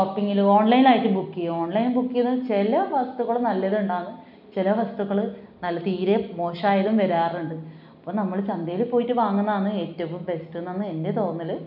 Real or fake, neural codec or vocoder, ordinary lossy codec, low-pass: real; none; none; 5.4 kHz